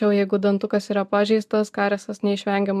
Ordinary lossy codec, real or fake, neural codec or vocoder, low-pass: AAC, 96 kbps; real; none; 14.4 kHz